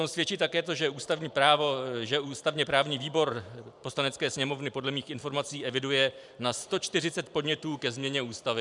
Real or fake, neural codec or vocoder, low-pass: fake; vocoder, 44.1 kHz, 128 mel bands every 512 samples, BigVGAN v2; 10.8 kHz